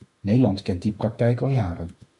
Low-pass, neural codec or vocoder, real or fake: 10.8 kHz; autoencoder, 48 kHz, 32 numbers a frame, DAC-VAE, trained on Japanese speech; fake